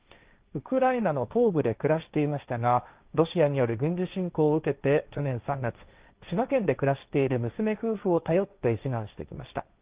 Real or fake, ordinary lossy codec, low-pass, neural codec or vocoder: fake; Opus, 24 kbps; 3.6 kHz; codec, 16 kHz, 1.1 kbps, Voila-Tokenizer